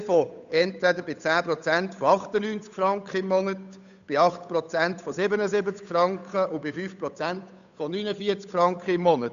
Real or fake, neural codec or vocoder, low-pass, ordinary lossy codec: fake; codec, 16 kHz, 8 kbps, FunCodec, trained on Chinese and English, 25 frames a second; 7.2 kHz; none